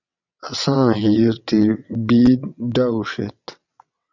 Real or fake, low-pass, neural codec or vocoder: fake; 7.2 kHz; vocoder, 22.05 kHz, 80 mel bands, WaveNeXt